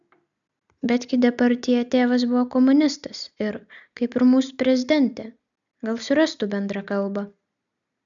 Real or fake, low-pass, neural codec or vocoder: real; 7.2 kHz; none